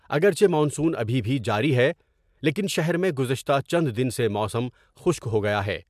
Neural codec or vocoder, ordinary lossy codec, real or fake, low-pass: none; MP3, 96 kbps; real; 14.4 kHz